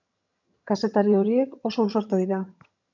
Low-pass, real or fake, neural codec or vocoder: 7.2 kHz; fake; vocoder, 22.05 kHz, 80 mel bands, HiFi-GAN